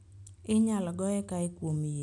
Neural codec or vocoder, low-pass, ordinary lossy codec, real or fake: none; 10.8 kHz; none; real